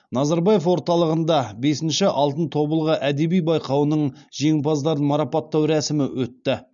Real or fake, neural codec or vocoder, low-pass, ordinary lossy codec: real; none; 7.2 kHz; none